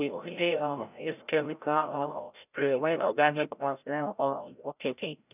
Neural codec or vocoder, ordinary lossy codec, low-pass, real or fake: codec, 16 kHz, 0.5 kbps, FreqCodec, larger model; none; 3.6 kHz; fake